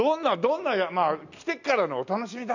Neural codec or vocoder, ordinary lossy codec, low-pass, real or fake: none; none; 7.2 kHz; real